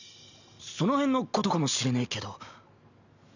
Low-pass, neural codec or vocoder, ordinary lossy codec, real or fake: 7.2 kHz; none; none; real